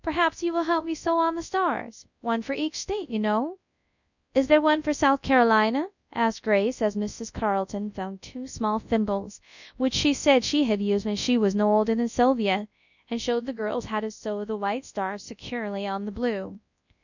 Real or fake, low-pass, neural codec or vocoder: fake; 7.2 kHz; codec, 24 kHz, 0.9 kbps, WavTokenizer, large speech release